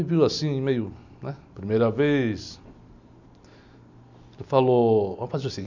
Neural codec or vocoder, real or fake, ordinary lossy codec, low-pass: none; real; none; 7.2 kHz